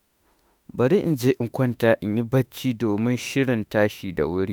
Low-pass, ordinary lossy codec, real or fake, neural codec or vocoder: none; none; fake; autoencoder, 48 kHz, 32 numbers a frame, DAC-VAE, trained on Japanese speech